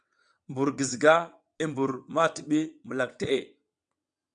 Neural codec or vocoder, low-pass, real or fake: vocoder, 22.05 kHz, 80 mel bands, WaveNeXt; 9.9 kHz; fake